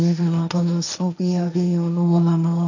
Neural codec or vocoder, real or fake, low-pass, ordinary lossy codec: codec, 16 kHz, 1.1 kbps, Voila-Tokenizer; fake; 7.2 kHz; none